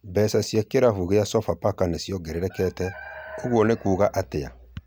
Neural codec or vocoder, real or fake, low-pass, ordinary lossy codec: none; real; none; none